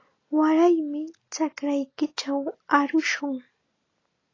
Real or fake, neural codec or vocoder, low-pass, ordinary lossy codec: real; none; 7.2 kHz; AAC, 32 kbps